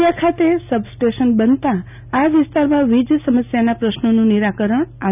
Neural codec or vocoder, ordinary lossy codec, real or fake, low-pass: none; none; real; 3.6 kHz